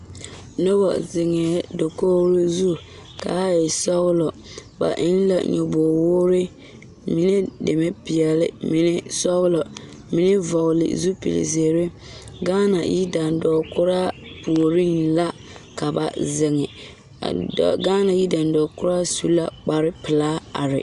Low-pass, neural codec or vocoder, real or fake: 10.8 kHz; none; real